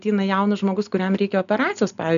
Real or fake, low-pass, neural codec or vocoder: real; 7.2 kHz; none